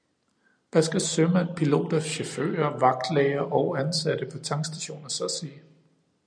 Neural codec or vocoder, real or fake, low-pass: none; real; 9.9 kHz